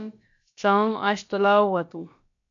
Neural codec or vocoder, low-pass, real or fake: codec, 16 kHz, about 1 kbps, DyCAST, with the encoder's durations; 7.2 kHz; fake